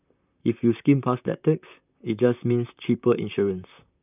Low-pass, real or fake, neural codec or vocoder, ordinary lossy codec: 3.6 kHz; fake; vocoder, 44.1 kHz, 128 mel bands, Pupu-Vocoder; none